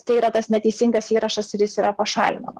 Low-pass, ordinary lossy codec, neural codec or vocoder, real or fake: 14.4 kHz; Opus, 16 kbps; vocoder, 44.1 kHz, 128 mel bands, Pupu-Vocoder; fake